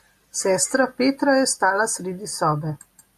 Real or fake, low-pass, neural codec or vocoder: real; 14.4 kHz; none